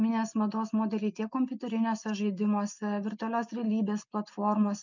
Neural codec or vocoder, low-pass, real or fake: none; 7.2 kHz; real